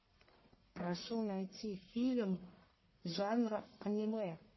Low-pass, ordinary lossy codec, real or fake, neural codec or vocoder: 7.2 kHz; MP3, 24 kbps; fake; codec, 44.1 kHz, 1.7 kbps, Pupu-Codec